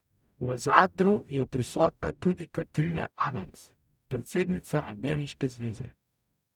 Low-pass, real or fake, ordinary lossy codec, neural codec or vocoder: 19.8 kHz; fake; none; codec, 44.1 kHz, 0.9 kbps, DAC